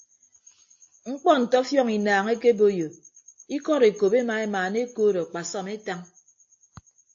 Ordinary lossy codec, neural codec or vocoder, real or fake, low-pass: AAC, 48 kbps; none; real; 7.2 kHz